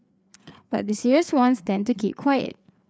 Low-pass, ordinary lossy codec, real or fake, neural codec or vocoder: none; none; fake; codec, 16 kHz, 4 kbps, FreqCodec, larger model